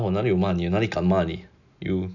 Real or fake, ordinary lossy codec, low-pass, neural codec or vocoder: real; none; 7.2 kHz; none